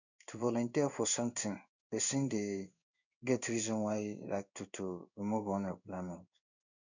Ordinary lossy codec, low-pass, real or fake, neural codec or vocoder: none; 7.2 kHz; fake; codec, 16 kHz in and 24 kHz out, 1 kbps, XY-Tokenizer